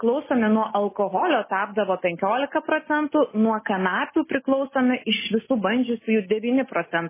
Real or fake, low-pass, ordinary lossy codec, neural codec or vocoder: real; 3.6 kHz; MP3, 16 kbps; none